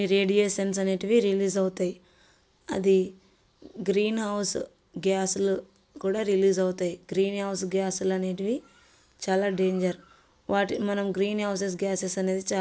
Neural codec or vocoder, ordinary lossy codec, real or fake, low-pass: none; none; real; none